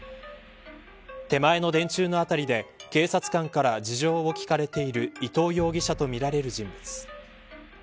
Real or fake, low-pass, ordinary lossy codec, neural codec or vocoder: real; none; none; none